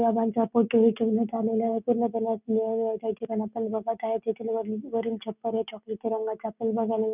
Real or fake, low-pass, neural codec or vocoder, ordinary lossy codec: real; 3.6 kHz; none; none